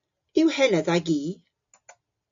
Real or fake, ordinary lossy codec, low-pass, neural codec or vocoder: real; MP3, 48 kbps; 7.2 kHz; none